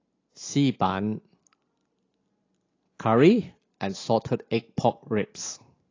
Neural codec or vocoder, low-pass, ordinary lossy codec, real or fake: none; 7.2 kHz; AAC, 32 kbps; real